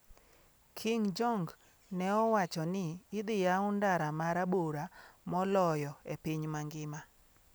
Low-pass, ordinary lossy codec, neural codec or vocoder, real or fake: none; none; none; real